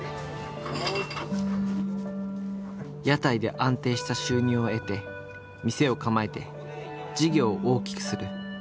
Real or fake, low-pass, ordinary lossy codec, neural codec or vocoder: real; none; none; none